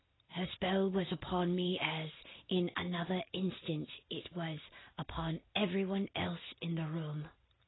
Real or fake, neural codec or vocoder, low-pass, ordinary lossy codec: real; none; 7.2 kHz; AAC, 16 kbps